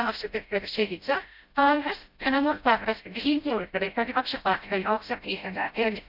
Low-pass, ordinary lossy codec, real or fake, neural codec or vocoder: 5.4 kHz; none; fake; codec, 16 kHz, 0.5 kbps, FreqCodec, smaller model